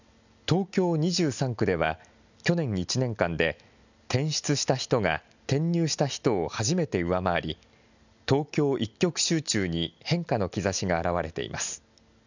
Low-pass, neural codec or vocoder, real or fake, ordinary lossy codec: 7.2 kHz; none; real; none